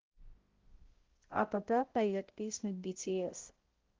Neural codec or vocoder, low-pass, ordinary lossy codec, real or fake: codec, 16 kHz, 0.5 kbps, X-Codec, HuBERT features, trained on balanced general audio; 7.2 kHz; Opus, 16 kbps; fake